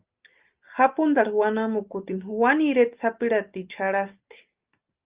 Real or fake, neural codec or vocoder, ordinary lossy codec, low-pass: real; none; Opus, 24 kbps; 3.6 kHz